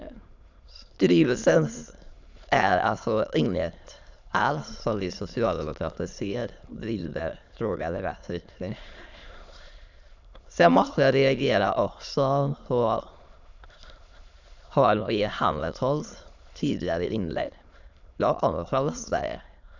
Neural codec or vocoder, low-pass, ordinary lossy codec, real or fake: autoencoder, 22.05 kHz, a latent of 192 numbers a frame, VITS, trained on many speakers; 7.2 kHz; none; fake